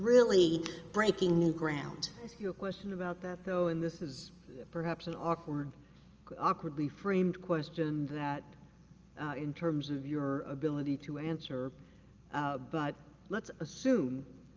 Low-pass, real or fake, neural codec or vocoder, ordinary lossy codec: 7.2 kHz; real; none; Opus, 24 kbps